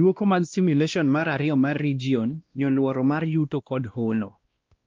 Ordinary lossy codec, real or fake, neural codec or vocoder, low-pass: Opus, 32 kbps; fake; codec, 16 kHz, 1 kbps, X-Codec, WavLM features, trained on Multilingual LibriSpeech; 7.2 kHz